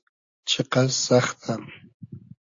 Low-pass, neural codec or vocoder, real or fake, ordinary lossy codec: 7.2 kHz; none; real; AAC, 48 kbps